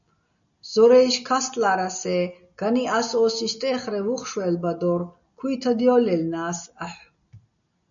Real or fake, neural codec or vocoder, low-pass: real; none; 7.2 kHz